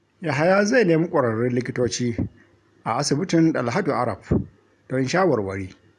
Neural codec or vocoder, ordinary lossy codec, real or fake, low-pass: none; none; real; none